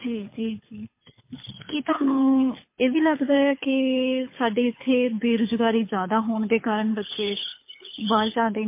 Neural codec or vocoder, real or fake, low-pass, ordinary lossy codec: codec, 24 kHz, 6 kbps, HILCodec; fake; 3.6 kHz; MP3, 24 kbps